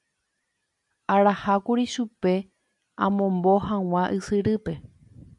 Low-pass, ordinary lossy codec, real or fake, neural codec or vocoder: 10.8 kHz; MP3, 96 kbps; real; none